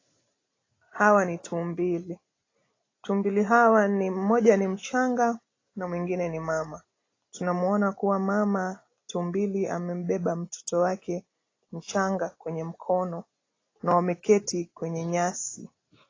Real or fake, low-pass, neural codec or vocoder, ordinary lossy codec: real; 7.2 kHz; none; AAC, 32 kbps